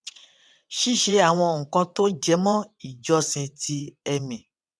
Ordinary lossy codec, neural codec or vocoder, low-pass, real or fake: none; vocoder, 22.05 kHz, 80 mel bands, WaveNeXt; none; fake